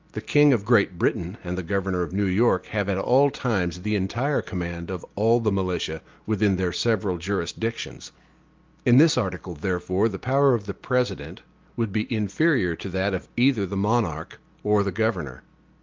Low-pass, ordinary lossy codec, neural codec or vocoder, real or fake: 7.2 kHz; Opus, 32 kbps; none; real